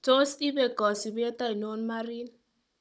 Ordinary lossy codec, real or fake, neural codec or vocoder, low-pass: none; fake; codec, 16 kHz, 16 kbps, FunCodec, trained on Chinese and English, 50 frames a second; none